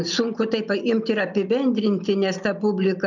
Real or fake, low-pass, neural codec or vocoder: real; 7.2 kHz; none